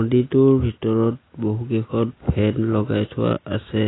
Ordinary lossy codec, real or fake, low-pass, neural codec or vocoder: AAC, 16 kbps; real; 7.2 kHz; none